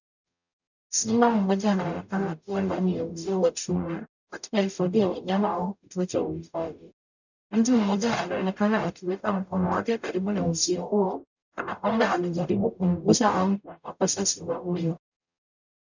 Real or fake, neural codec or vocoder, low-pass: fake; codec, 44.1 kHz, 0.9 kbps, DAC; 7.2 kHz